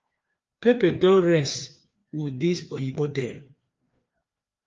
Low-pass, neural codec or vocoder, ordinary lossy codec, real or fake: 7.2 kHz; codec, 16 kHz, 2 kbps, FreqCodec, larger model; Opus, 32 kbps; fake